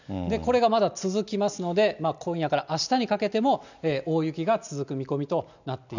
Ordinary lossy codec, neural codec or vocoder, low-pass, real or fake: none; none; 7.2 kHz; real